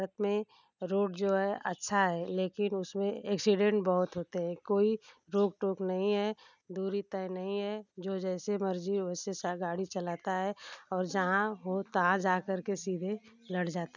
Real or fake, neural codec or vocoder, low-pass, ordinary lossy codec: real; none; 7.2 kHz; none